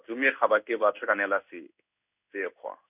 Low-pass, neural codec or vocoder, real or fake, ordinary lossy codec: 3.6 kHz; codec, 16 kHz in and 24 kHz out, 1 kbps, XY-Tokenizer; fake; none